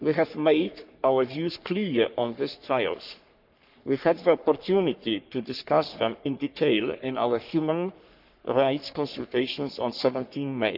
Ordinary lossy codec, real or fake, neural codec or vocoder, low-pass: none; fake; codec, 44.1 kHz, 3.4 kbps, Pupu-Codec; 5.4 kHz